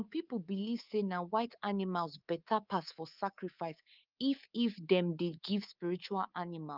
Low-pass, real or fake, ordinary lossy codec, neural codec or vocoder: 5.4 kHz; fake; Opus, 32 kbps; codec, 24 kHz, 3.1 kbps, DualCodec